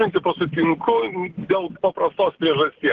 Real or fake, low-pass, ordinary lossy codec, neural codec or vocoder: real; 7.2 kHz; Opus, 16 kbps; none